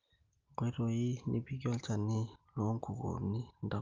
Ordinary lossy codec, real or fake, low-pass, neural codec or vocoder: Opus, 32 kbps; real; 7.2 kHz; none